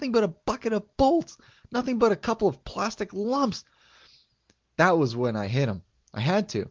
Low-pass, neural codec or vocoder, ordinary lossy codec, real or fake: 7.2 kHz; none; Opus, 32 kbps; real